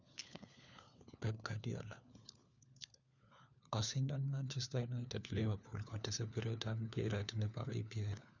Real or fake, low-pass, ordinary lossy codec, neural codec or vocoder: fake; 7.2 kHz; none; codec, 16 kHz, 4 kbps, FunCodec, trained on LibriTTS, 50 frames a second